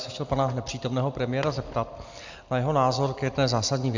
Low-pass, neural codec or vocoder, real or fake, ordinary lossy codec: 7.2 kHz; none; real; MP3, 64 kbps